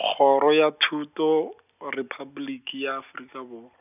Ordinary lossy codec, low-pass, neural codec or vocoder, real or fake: none; 3.6 kHz; none; real